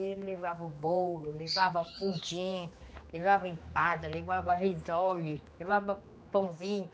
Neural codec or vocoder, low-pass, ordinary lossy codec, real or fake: codec, 16 kHz, 2 kbps, X-Codec, HuBERT features, trained on general audio; none; none; fake